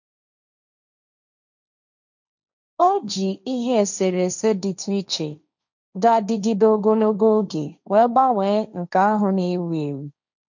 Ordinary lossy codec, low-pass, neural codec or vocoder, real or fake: none; 7.2 kHz; codec, 16 kHz, 1.1 kbps, Voila-Tokenizer; fake